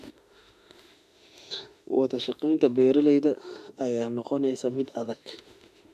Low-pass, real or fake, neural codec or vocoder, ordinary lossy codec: 14.4 kHz; fake; autoencoder, 48 kHz, 32 numbers a frame, DAC-VAE, trained on Japanese speech; none